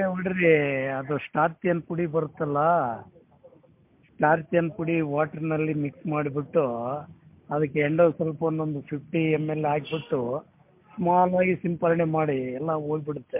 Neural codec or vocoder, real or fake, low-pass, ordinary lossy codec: none; real; 3.6 kHz; MP3, 32 kbps